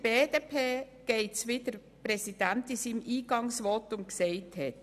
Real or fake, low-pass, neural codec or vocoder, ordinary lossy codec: real; 14.4 kHz; none; none